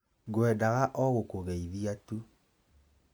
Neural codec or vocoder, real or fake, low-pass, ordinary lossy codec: none; real; none; none